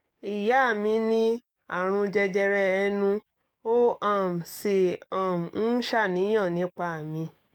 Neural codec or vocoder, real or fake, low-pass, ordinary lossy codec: codec, 44.1 kHz, 7.8 kbps, DAC; fake; 19.8 kHz; none